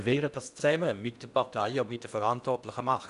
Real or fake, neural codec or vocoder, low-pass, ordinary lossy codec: fake; codec, 16 kHz in and 24 kHz out, 0.8 kbps, FocalCodec, streaming, 65536 codes; 10.8 kHz; none